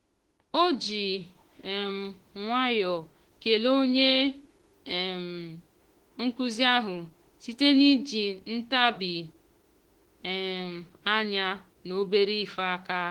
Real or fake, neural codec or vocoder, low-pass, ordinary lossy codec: fake; autoencoder, 48 kHz, 32 numbers a frame, DAC-VAE, trained on Japanese speech; 19.8 kHz; Opus, 16 kbps